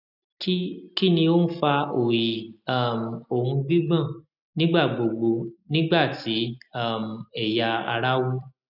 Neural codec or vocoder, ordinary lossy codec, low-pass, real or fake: none; none; 5.4 kHz; real